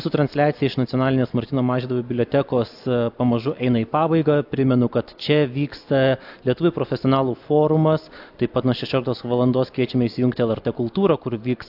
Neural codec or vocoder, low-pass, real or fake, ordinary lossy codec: none; 5.4 kHz; real; AAC, 48 kbps